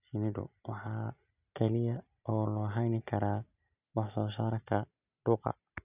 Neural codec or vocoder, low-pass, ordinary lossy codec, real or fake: none; 3.6 kHz; none; real